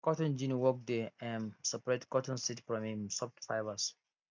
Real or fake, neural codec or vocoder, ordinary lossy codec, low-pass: real; none; MP3, 64 kbps; 7.2 kHz